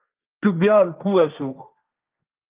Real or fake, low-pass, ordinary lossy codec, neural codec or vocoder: fake; 3.6 kHz; Opus, 24 kbps; codec, 16 kHz, 1.1 kbps, Voila-Tokenizer